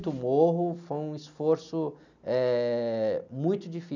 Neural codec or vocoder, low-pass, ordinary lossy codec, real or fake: none; 7.2 kHz; none; real